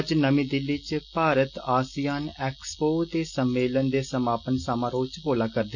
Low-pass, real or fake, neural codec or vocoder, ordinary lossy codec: 7.2 kHz; real; none; none